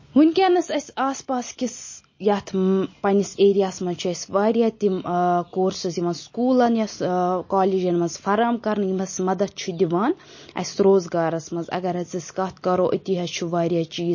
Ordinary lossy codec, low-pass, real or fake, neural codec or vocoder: MP3, 32 kbps; 7.2 kHz; real; none